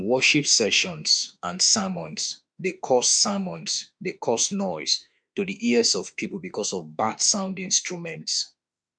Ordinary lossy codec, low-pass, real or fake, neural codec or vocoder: none; 9.9 kHz; fake; autoencoder, 48 kHz, 32 numbers a frame, DAC-VAE, trained on Japanese speech